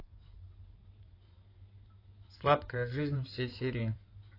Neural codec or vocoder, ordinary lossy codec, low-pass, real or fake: codec, 16 kHz in and 24 kHz out, 2.2 kbps, FireRedTTS-2 codec; AAC, 32 kbps; 5.4 kHz; fake